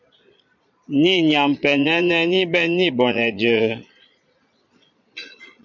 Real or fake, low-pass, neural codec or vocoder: fake; 7.2 kHz; vocoder, 22.05 kHz, 80 mel bands, Vocos